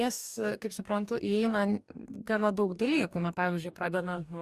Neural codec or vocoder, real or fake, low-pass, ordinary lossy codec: codec, 44.1 kHz, 2.6 kbps, DAC; fake; 14.4 kHz; Opus, 64 kbps